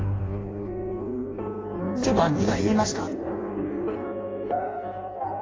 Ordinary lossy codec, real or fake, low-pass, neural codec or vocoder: AAC, 32 kbps; fake; 7.2 kHz; codec, 16 kHz in and 24 kHz out, 0.6 kbps, FireRedTTS-2 codec